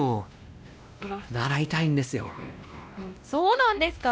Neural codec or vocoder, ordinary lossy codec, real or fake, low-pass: codec, 16 kHz, 1 kbps, X-Codec, WavLM features, trained on Multilingual LibriSpeech; none; fake; none